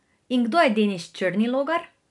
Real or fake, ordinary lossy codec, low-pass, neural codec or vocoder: real; none; 10.8 kHz; none